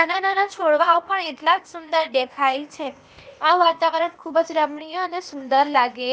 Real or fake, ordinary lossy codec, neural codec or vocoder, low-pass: fake; none; codec, 16 kHz, 0.8 kbps, ZipCodec; none